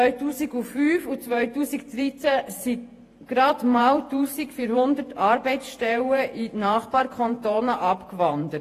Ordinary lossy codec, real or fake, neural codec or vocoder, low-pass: AAC, 48 kbps; fake; vocoder, 48 kHz, 128 mel bands, Vocos; 14.4 kHz